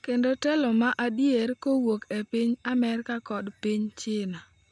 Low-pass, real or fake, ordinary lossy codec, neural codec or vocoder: 9.9 kHz; real; none; none